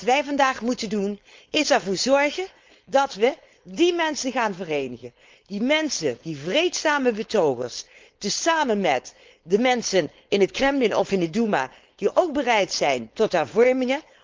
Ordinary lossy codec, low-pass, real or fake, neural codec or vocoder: Opus, 32 kbps; 7.2 kHz; fake; codec, 16 kHz, 4.8 kbps, FACodec